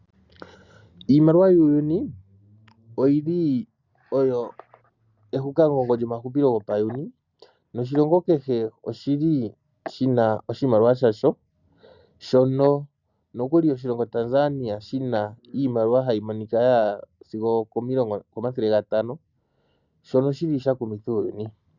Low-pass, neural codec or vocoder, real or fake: 7.2 kHz; none; real